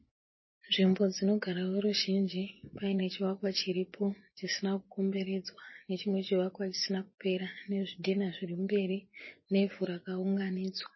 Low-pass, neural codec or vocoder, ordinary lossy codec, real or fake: 7.2 kHz; vocoder, 24 kHz, 100 mel bands, Vocos; MP3, 24 kbps; fake